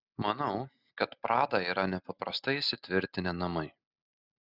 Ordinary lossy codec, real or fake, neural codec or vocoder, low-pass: Opus, 64 kbps; real; none; 5.4 kHz